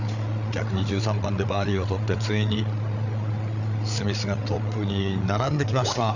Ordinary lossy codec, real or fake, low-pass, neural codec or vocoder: none; fake; 7.2 kHz; codec, 16 kHz, 8 kbps, FreqCodec, larger model